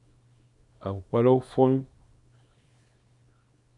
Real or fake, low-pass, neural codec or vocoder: fake; 10.8 kHz; codec, 24 kHz, 0.9 kbps, WavTokenizer, small release